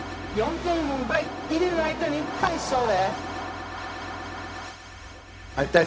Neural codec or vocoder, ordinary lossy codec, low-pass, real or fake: codec, 16 kHz, 0.4 kbps, LongCat-Audio-Codec; none; none; fake